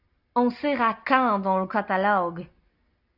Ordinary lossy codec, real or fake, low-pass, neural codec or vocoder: AAC, 48 kbps; real; 5.4 kHz; none